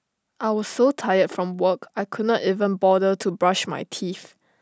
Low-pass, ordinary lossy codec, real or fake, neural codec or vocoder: none; none; real; none